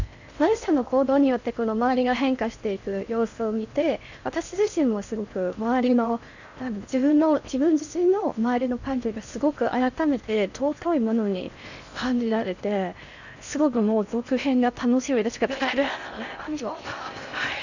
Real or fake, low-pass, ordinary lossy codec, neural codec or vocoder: fake; 7.2 kHz; none; codec, 16 kHz in and 24 kHz out, 0.6 kbps, FocalCodec, streaming, 4096 codes